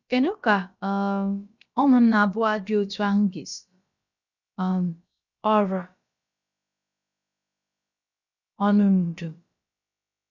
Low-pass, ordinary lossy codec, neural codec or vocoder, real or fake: 7.2 kHz; none; codec, 16 kHz, about 1 kbps, DyCAST, with the encoder's durations; fake